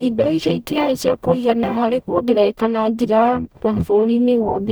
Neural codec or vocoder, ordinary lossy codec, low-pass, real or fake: codec, 44.1 kHz, 0.9 kbps, DAC; none; none; fake